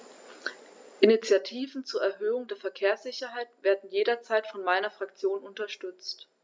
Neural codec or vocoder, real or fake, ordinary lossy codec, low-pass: none; real; none; none